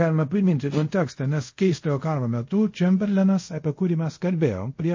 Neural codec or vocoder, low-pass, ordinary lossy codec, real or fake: codec, 24 kHz, 0.5 kbps, DualCodec; 7.2 kHz; MP3, 32 kbps; fake